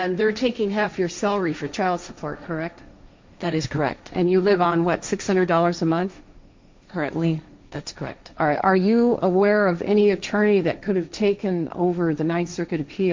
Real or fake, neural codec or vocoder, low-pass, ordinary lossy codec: fake; codec, 16 kHz, 1.1 kbps, Voila-Tokenizer; 7.2 kHz; MP3, 64 kbps